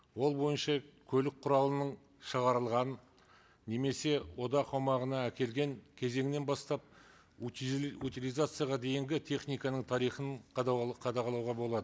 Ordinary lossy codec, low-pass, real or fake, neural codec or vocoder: none; none; real; none